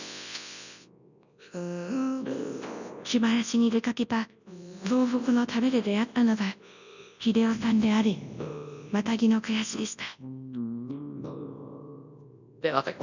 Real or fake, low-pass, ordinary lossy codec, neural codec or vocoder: fake; 7.2 kHz; none; codec, 24 kHz, 0.9 kbps, WavTokenizer, large speech release